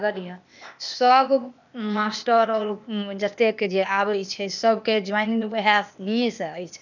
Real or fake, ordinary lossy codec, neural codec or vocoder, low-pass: fake; none; codec, 16 kHz, 0.8 kbps, ZipCodec; 7.2 kHz